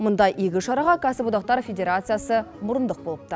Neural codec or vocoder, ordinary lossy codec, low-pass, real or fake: none; none; none; real